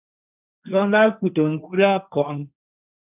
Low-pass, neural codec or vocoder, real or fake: 3.6 kHz; codec, 16 kHz, 1.1 kbps, Voila-Tokenizer; fake